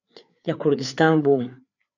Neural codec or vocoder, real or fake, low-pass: codec, 16 kHz, 4 kbps, FreqCodec, larger model; fake; 7.2 kHz